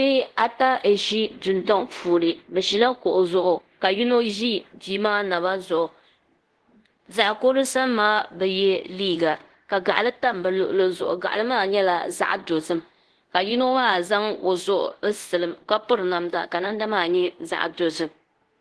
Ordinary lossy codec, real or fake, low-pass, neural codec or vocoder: Opus, 16 kbps; fake; 10.8 kHz; codec, 24 kHz, 0.5 kbps, DualCodec